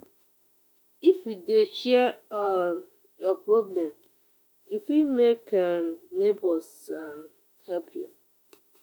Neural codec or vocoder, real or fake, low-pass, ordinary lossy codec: autoencoder, 48 kHz, 32 numbers a frame, DAC-VAE, trained on Japanese speech; fake; none; none